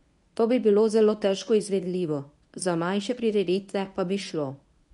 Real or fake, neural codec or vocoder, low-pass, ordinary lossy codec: fake; codec, 24 kHz, 0.9 kbps, WavTokenizer, medium speech release version 1; 10.8 kHz; none